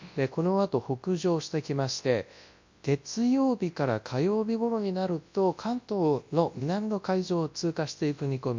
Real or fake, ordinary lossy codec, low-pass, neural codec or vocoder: fake; MP3, 48 kbps; 7.2 kHz; codec, 24 kHz, 0.9 kbps, WavTokenizer, large speech release